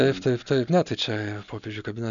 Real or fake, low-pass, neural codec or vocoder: real; 7.2 kHz; none